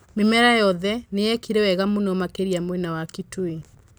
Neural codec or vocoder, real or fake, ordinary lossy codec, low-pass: vocoder, 44.1 kHz, 128 mel bands every 256 samples, BigVGAN v2; fake; none; none